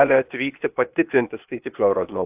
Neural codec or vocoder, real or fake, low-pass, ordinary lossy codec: codec, 16 kHz, 0.8 kbps, ZipCodec; fake; 3.6 kHz; AAC, 32 kbps